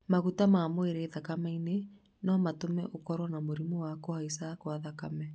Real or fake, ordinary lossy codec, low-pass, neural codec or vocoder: real; none; none; none